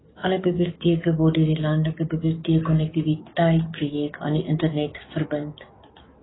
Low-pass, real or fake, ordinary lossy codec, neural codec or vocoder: 7.2 kHz; fake; AAC, 16 kbps; codec, 44.1 kHz, 7.8 kbps, DAC